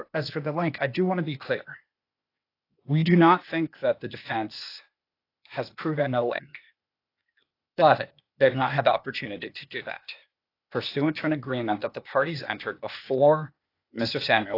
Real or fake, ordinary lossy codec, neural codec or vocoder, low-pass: fake; AAC, 32 kbps; codec, 16 kHz, 0.8 kbps, ZipCodec; 5.4 kHz